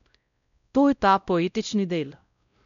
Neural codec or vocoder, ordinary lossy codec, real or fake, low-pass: codec, 16 kHz, 0.5 kbps, X-Codec, WavLM features, trained on Multilingual LibriSpeech; none; fake; 7.2 kHz